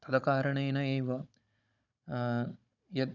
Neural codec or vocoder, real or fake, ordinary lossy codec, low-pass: codec, 16 kHz, 16 kbps, FunCodec, trained on Chinese and English, 50 frames a second; fake; none; 7.2 kHz